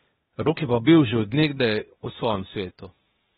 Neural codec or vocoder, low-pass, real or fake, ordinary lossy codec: codec, 16 kHz, 0.8 kbps, ZipCodec; 7.2 kHz; fake; AAC, 16 kbps